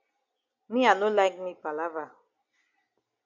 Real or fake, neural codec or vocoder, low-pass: real; none; 7.2 kHz